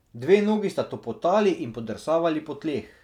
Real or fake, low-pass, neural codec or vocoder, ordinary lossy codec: real; 19.8 kHz; none; none